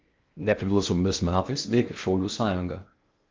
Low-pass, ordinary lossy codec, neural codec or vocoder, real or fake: 7.2 kHz; Opus, 24 kbps; codec, 16 kHz in and 24 kHz out, 0.6 kbps, FocalCodec, streaming, 2048 codes; fake